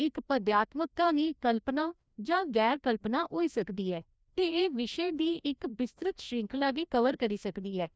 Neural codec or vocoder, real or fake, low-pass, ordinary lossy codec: codec, 16 kHz, 1 kbps, FreqCodec, larger model; fake; none; none